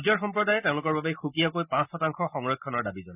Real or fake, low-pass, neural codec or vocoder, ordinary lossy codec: real; 3.6 kHz; none; none